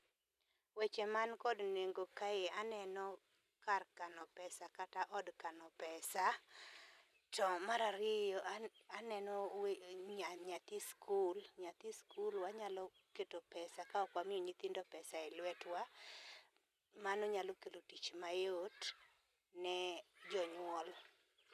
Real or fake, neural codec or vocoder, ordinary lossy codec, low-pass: real; none; none; 14.4 kHz